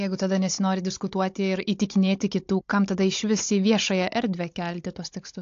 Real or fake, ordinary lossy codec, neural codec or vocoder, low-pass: fake; AAC, 48 kbps; codec, 16 kHz, 16 kbps, FunCodec, trained on Chinese and English, 50 frames a second; 7.2 kHz